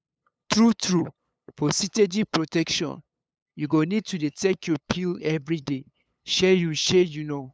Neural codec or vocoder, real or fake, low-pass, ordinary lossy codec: codec, 16 kHz, 8 kbps, FunCodec, trained on LibriTTS, 25 frames a second; fake; none; none